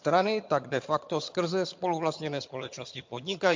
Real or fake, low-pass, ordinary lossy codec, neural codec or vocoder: fake; 7.2 kHz; MP3, 48 kbps; vocoder, 22.05 kHz, 80 mel bands, HiFi-GAN